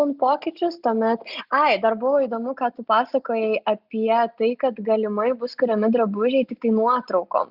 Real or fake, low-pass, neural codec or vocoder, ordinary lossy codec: real; 5.4 kHz; none; Opus, 64 kbps